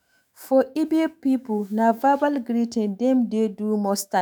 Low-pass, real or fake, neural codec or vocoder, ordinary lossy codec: none; fake; autoencoder, 48 kHz, 128 numbers a frame, DAC-VAE, trained on Japanese speech; none